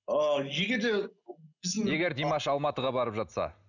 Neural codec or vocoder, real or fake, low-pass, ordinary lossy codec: none; real; 7.2 kHz; none